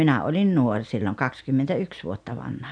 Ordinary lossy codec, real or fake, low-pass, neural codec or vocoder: none; real; 9.9 kHz; none